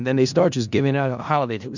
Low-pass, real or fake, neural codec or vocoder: 7.2 kHz; fake; codec, 16 kHz in and 24 kHz out, 0.4 kbps, LongCat-Audio-Codec, four codebook decoder